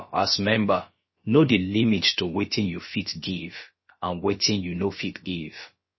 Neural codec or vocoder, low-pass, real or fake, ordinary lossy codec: codec, 16 kHz, about 1 kbps, DyCAST, with the encoder's durations; 7.2 kHz; fake; MP3, 24 kbps